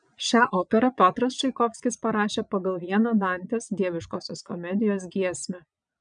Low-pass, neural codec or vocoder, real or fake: 9.9 kHz; vocoder, 22.05 kHz, 80 mel bands, Vocos; fake